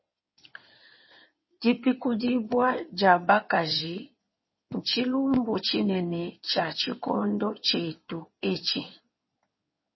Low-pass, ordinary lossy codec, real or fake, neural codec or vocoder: 7.2 kHz; MP3, 24 kbps; fake; codec, 16 kHz in and 24 kHz out, 2.2 kbps, FireRedTTS-2 codec